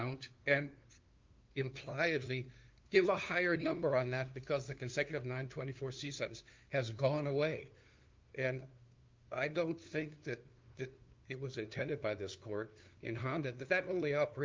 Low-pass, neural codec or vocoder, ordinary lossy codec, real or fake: 7.2 kHz; codec, 16 kHz, 2 kbps, FunCodec, trained on LibriTTS, 25 frames a second; Opus, 32 kbps; fake